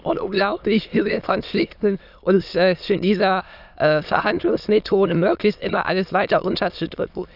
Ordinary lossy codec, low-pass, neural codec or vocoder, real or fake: none; 5.4 kHz; autoencoder, 22.05 kHz, a latent of 192 numbers a frame, VITS, trained on many speakers; fake